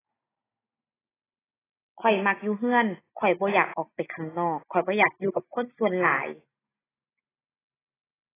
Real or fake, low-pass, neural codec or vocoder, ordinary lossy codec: real; 3.6 kHz; none; AAC, 16 kbps